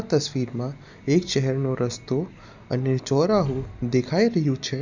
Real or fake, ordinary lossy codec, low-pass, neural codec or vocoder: real; none; 7.2 kHz; none